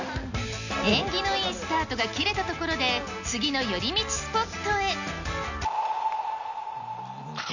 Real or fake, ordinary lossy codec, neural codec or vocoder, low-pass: real; none; none; 7.2 kHz